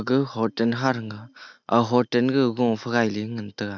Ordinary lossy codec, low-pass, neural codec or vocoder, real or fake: none; 7.2 kHz; none; real